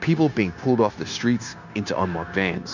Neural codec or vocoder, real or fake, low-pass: codec, 16 kHz, 0.9 kbps, LongCat-Audio-Codec; fake; 7.2 kHz